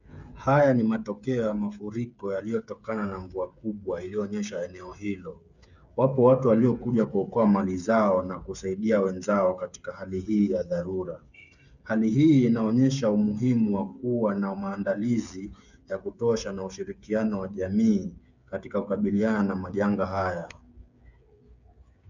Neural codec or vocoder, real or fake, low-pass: codec, 16 kHz, 8 kbps, FreqCodec, smaller model; fake; 7.2 kHz